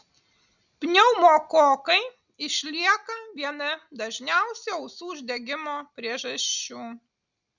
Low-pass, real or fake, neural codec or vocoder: 7.2 kHz; real; none